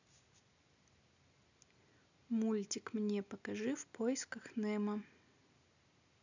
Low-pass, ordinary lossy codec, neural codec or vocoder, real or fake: 7.2 kHz; none; none; real